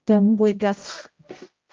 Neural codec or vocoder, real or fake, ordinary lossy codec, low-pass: codec, 16 kHz, 0.5 kbps, X-Codec, HuBERT features, trained on general audio; fake; Opus, 24 kbps; 7.2 kHz